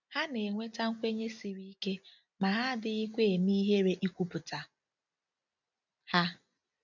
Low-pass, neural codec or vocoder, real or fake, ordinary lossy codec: 7.2 kHz; none; real; none